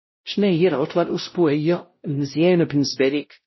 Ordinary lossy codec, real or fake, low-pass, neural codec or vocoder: MP3, 24 kbps; fake; 7.2 kHz; codec, 16 kHz, 0.5 kbps, X-Codec, WavLM features, trained on Multilingual LibriSpeech